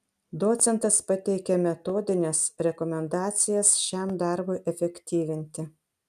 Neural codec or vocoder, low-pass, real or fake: none; 14.4 kHz; real